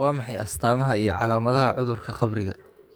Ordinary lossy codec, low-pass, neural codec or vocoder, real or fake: none; none; codec, 44.1 kHz, 2.6 kbps, SNAC; fake